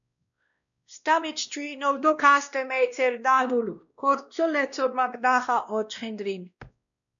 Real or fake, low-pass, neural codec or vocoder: fake; 7.2 kHz; codec, 16 kHz, 1 kbps, X-Codec, WavLM features, trained on Multilingual LibriSpeech